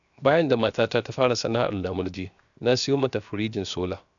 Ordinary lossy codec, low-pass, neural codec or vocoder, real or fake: none; 7.2 kHz; codec, 16 kHz, 0.7 kbps, FocalCodec; fake